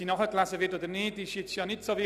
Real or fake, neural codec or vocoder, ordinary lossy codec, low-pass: real; none; none; 14.4 kHz